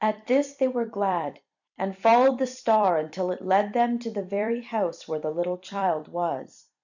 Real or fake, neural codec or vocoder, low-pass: fake; vocoder, 44.1 kHz, 128 mel bands every 256 samples, BigVGAN v2; 7.2 kHz